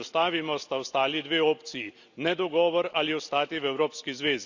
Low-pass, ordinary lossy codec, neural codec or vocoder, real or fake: 7.2 kHz; Opus, 64 kbps; none; real